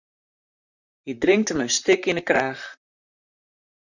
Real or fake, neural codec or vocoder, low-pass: fake; vocoder, 44.1 kHz, 128 mel bands, Pupu-Vocoder; 7.2 kHz